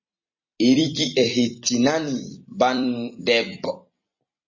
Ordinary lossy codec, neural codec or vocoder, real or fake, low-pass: MP3, 32 kbps; vocoder, 44.1 kHz, 128 mel bands every 256 samples, BigVGAN v2; fake; 7.2 kHz